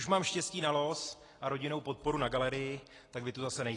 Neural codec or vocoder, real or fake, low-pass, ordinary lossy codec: none; real; 10.8 kHz; AAC, 32 kbps